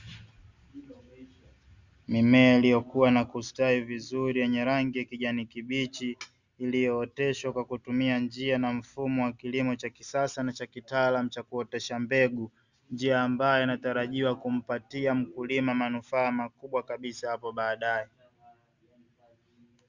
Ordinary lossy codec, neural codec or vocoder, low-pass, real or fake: Opus, 64 kbps; none; 7.2 kHz; real